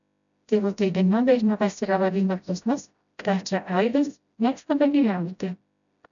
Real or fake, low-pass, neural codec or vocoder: fake; 7.2 kHz; codec, 16 kHz, 0.5 kbps, FreqCodec, smaller model